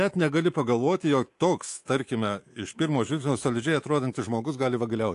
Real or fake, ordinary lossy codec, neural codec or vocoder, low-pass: fake; AAC, 48 kbps; codec, 24 kHz, 3.1 kbps, DualCodec; 10.8 kHz